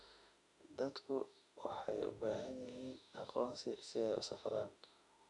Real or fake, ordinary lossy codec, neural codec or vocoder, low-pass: fake; none; autoencoder, 48 kHz, 32 numbers a frame, DAC-VAE, trained on Japanese speech; 10.8 kHz